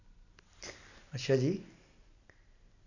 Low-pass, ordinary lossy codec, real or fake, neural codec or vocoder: 7.2 kHz; none; real; none